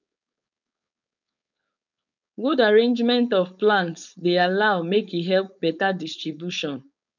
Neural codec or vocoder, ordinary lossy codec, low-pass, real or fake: codec, 16 kHz, 4.8 kbps, FACodec; none; 7.2 kHz; fake